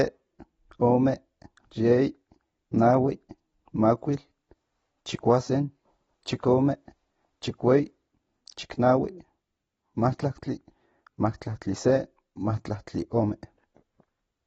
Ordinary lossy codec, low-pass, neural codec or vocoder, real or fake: AAC, 24 kbps; 19.8 kHz; none; real